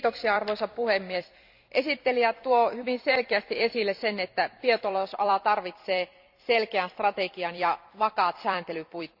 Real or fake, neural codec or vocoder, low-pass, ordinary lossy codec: fake; vocoder, 44.1 kHz, 128 mel bands every 256 samples, BigVGAN v2; 5.4 kHz; none